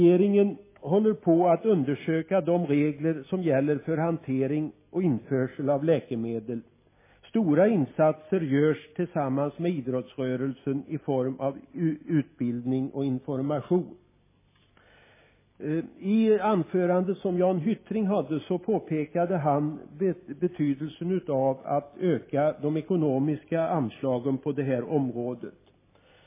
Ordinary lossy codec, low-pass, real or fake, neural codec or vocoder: MP3, 16 kbps; 3.6 kHz; real; none